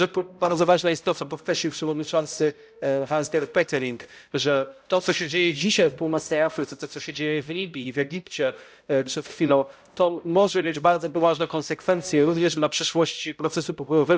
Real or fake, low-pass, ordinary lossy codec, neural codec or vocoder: fake; none; none; codec, 16 kHz, 0.5 kbps, X-Codec, HuBERT features, trained on balanced general audio